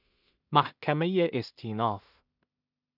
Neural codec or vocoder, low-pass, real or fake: codec, 16 kHz in and 24 kHz out, 0.4 kbps, LongCat-Audio-Codec, two codebook decoder; 5.4 kHz; fake